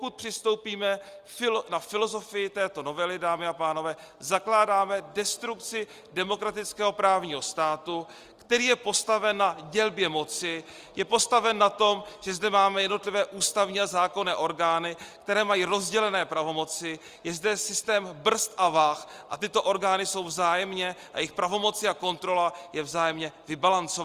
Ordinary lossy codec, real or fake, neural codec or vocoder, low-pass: Opus, 32 kbps; real; none; 14.4 kHz